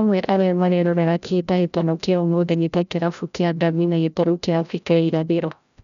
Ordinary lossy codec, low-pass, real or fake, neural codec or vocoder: none; 7.2 kHz; fake; codec, 16 kHz, 0.5 kbps, FreqCodec, larger model